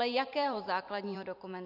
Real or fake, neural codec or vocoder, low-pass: fake; vocoder, 44.1 kHz, 128 mel bands every 512 samples, BigVGAN v2; 5.4 kHz